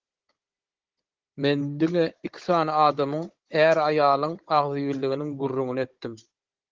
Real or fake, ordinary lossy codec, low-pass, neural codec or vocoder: fake; Opus, 16 kbps; 7.2 kHz; codec, 16 kHz, 16 kbps, FunCodec, trained on Chinese and English, 50 frames a second